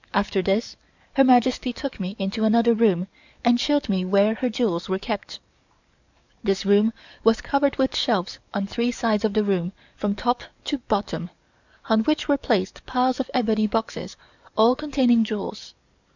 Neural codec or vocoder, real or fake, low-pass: codec, 44.1 kHz, 7.8 kbps, DAC; fake; 7.2 kHz